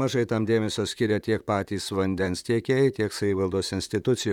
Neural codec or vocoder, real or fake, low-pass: vocoder, 44.1 kHz, 128 mel bands, Pupu-Vocoder; fake; 19.8 kHz